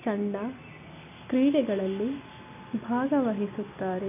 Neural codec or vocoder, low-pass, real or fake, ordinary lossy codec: none; 3.6 kHz; real; none